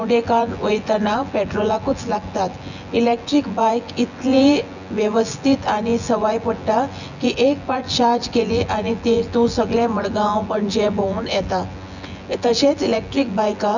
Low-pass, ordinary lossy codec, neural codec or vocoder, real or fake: 7.2 kHz; none; vocoder, 24 kHz, 100 mel bands, Vocos; fake